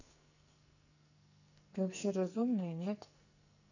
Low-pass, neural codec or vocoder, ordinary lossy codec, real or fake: 7.2 kHz; codec, 44.1 kHz, 2.6 kbps, SNAC; none; fake